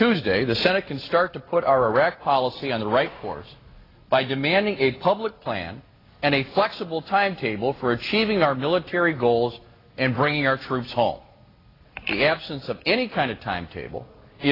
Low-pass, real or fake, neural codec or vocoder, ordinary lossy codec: 5.4 kHz; real; none; AAC, 24 kbps